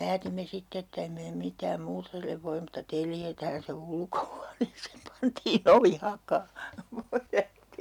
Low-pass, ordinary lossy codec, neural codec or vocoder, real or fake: 19.8 kHz; none; none; real